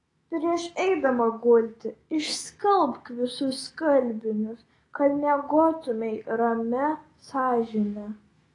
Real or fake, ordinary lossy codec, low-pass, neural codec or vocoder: fake; AAC, 32 kbps; 10.8 kHz; autoencoder, 48 kHz, 128 numbers a frame, DAC-VAE, trained on Japanese speech